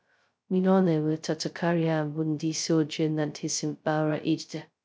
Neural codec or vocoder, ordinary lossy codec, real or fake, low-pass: codec, 16 kHz, 0.2 kbps, FocalCodec; none; fake; none